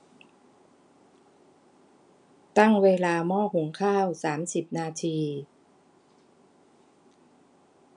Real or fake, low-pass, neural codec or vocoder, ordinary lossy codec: real; 9.9 kHz; none; none